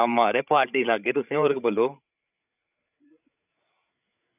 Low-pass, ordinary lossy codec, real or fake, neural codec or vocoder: 3.6 kHz; none; fake; codec, 16 kHz, 16 kbps, FreqCodec, larger model